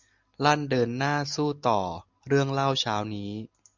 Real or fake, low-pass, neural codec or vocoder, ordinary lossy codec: real; 7.2 kHz; none; MP3, 64 kbps